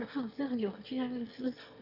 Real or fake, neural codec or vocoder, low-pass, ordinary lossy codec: fake; autoencoder, 22.05 kHz, a latent of 192 numbers a frame, VITS, trained on one speaker; 5.4 kHz; none